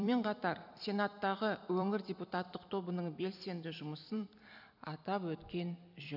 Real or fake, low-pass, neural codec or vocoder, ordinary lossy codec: fake; 5.4 kHz; vocoder, 44.1 kHz, 128 mel bands every 256 samples, BigVGAN v2; none